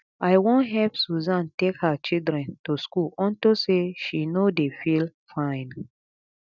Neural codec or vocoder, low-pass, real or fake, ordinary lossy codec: none; 7.2 kHz; real; none